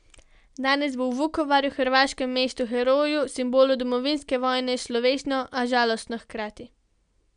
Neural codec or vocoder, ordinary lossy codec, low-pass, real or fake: none; none; 9.9 kHz; real